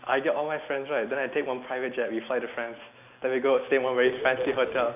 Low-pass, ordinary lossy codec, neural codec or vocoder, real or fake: 3.6 kHz; none; none; real